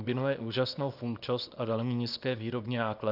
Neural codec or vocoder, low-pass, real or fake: codec, 24 kHz, 0.9 kbps, WavTokenizer, small release; 5.4 kHz; fake